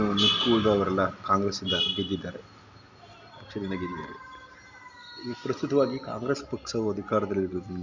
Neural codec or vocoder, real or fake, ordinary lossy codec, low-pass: none; real; MP3, 64 kbps; 7.2 kHz